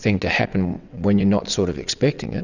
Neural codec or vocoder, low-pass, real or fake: vocoder, 22.05 kHz, 80 mel bands, WaveNeXt; 7.2 kHz; fake